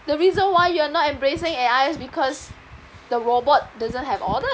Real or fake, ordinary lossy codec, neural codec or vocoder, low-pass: real; none; none; none